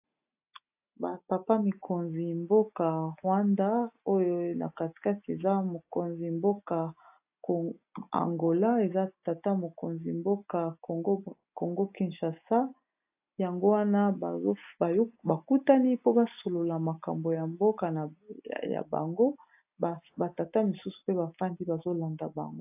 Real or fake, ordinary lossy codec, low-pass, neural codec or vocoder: real; AAC, 32 kbps; 3.6 kHz; none